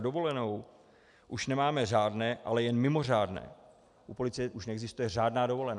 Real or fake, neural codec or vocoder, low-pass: real; none; 10.8 kHz